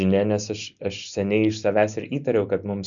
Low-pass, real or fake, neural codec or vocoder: 7.2 kHz; real; none